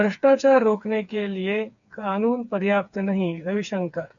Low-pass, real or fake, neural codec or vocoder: 7.2 kHz; fake; codec, 16 kHz, 4 kbps, FreqCodec, smaller model